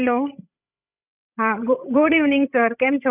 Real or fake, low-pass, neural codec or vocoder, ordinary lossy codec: fake; 3.6 kHz; codec, 16 kHz, 16 kbps, FreqCodec, larger model; none